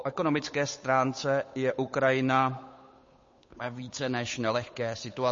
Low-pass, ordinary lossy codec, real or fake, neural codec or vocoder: 7.2 kHz; MP3, 32 kbps; fake; codec, 16 kHz, 8 kbps, FunCodec, trained on Chinese and English, 25 frames a second